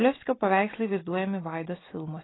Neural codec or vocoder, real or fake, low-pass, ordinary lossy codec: none; real; 7.2 kHz; AAC, 16 kbps